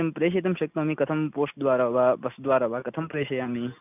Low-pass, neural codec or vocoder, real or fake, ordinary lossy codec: 3.6 kHz; none; real; none